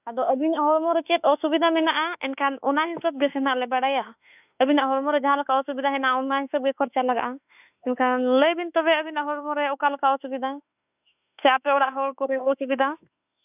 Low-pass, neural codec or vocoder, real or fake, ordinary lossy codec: 3.6 kHz; autoencoder, 48 kHz, 32 numbers a frame, DAC-VAE, trained on Japanese speech; fake; none